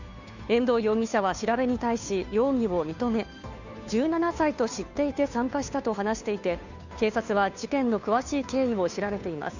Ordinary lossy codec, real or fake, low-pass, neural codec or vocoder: none; fake; 7.2 kHz; codec, 16 kHz, 2 kbps, FunCodec, trained on Chinese and English, 25 frames a second